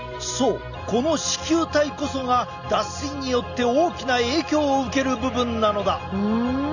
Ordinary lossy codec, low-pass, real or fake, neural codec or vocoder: none; 7.2 kHz; real; none